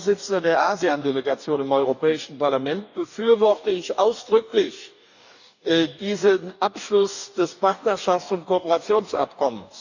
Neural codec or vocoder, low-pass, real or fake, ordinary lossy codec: codec, 44.1 kHz, 2.6 kbps, DAC; 7.2 kHz; fake; none